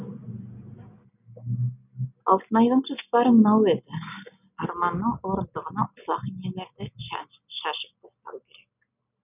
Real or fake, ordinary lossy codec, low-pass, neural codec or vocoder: real; none; 3.6 kHz; none